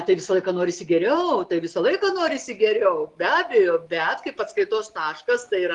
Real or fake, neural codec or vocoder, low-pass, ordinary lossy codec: real; none; 10.8 kHz; Opus, 32 kbps